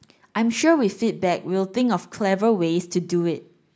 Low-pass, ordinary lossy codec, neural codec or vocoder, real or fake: none; none; none; real